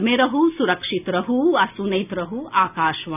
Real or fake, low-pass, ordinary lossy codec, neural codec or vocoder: real; 3.6 kHz; none; none